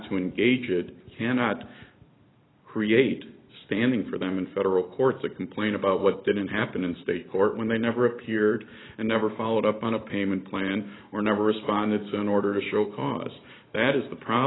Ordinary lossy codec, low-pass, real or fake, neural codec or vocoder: AAC, 16 kbps; 7.2 kHz; fake; vocoder, 44.1 kHz, 128 mel bands every 512 samples, BigVGAN v2